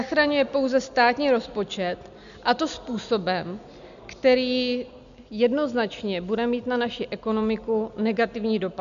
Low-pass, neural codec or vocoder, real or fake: 7.2 kHz; none; real